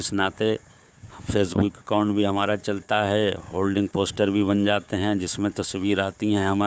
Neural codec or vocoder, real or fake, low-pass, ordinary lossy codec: codec, 16 kHz, 4 kbps, FunCodec, trained on Chinese and English, 50 frames a second; fake; none; none